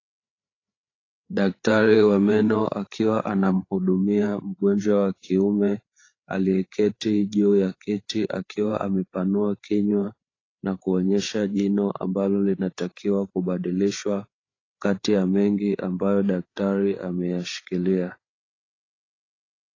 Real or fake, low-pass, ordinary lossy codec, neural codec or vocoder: fake; 7.2 kHz; AAC, 32 kbps; codec, 16 kHz, 8 kbps, FreqCodec, larger model